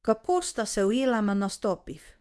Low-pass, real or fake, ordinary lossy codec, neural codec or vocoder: none; fake; none; codec, 24 kHz, 0.9 kbps, WavTokenizer, medium speech release version 2